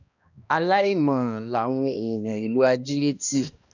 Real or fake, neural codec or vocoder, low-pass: fake; codec, 16 kHz, 1 kbps, X-Codec, HuBERT features, trained on balanced general audio; 7.2 kHz